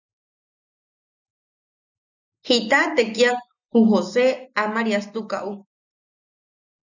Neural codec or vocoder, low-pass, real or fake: none; 7.2 kHz; real